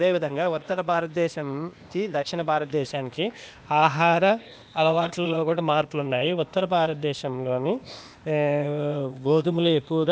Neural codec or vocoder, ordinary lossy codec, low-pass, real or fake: codec, 16 kHz, 0.8 kbps, ZipCodec; none; none; fake